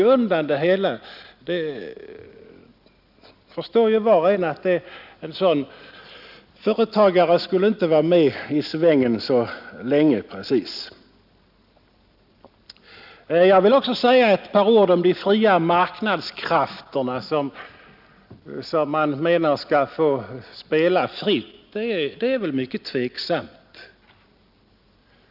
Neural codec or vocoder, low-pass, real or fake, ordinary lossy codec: none; 5.4 kHz; real; none